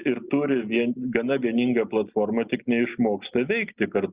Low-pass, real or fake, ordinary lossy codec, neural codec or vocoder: 3.6 kHz; real; Opus, 64 kbps; none